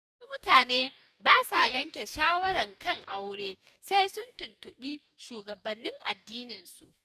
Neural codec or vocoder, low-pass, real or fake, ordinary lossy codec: codec, 44.1 kHz, 2.6 kbps, DAC; 14.4 kHz; fake; none